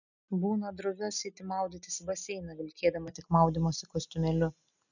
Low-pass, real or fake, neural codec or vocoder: 7.2 kHz; real; none